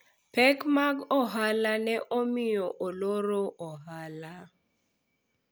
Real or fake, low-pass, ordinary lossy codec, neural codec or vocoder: real; none; none; none